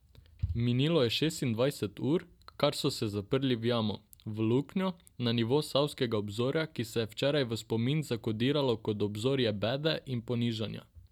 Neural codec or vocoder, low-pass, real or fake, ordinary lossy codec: none; 19.8 kHz; real; none